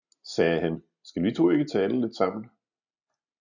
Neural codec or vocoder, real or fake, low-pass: none; real; 7.2 kHz